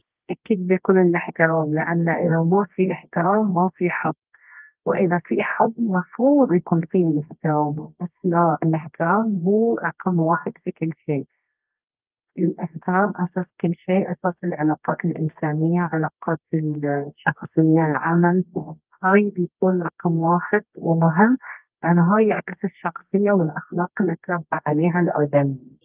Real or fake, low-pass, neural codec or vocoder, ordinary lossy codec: fake; 3.6 kHz; codec, 24 kHz, 0.9 kbps, WavTokenizer, medium music audio release; Opus, 32 kbps